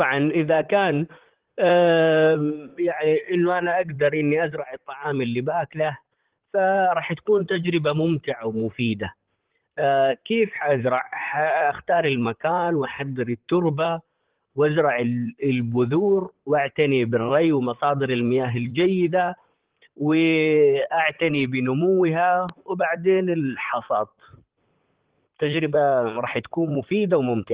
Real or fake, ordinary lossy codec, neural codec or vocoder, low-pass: fake; Opus, 16 kbps; vocoder, 44.1 kHz, 128 mel bands, Pupu-Vocoder; 3.6 kHz